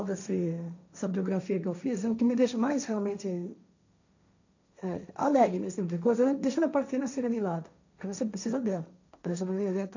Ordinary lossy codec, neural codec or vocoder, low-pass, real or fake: none; codec, 16 kHz, 1.1 kbps, Voila-Tokenizer; 7.2 kHz; fake